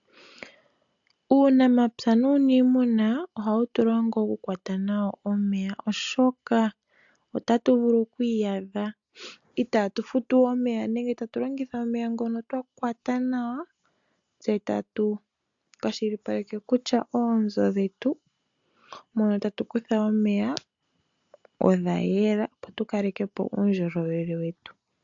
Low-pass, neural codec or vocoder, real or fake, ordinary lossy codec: 7.2 kHz; none; real; AAC, 64 kbps